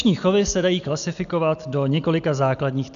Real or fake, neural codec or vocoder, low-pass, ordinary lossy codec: real; none; 7.2 kHz; MP3, 96 kbps